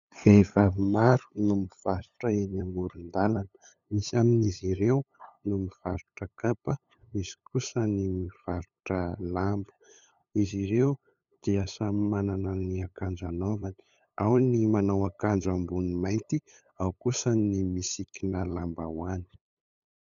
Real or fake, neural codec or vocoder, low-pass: fake; codec, 16 kHz, 8 kbps, FunCodec, trained on LibriTTS, 25 frames a second; 7.2 kHz